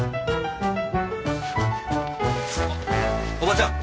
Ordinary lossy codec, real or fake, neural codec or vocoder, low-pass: none; real; none; none